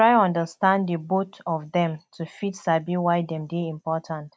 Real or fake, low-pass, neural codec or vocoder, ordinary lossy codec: real; none; none; none